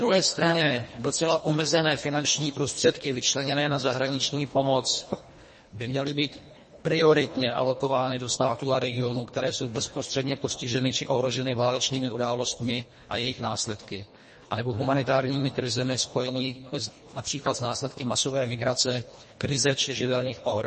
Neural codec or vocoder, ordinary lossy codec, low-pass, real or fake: codec, 24 kHz, 1.5 kbps, HILCodec; MP3, 32 kbps; 10.8 kHz; fake